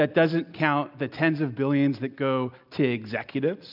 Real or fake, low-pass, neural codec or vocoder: real; 5.4 kHz; none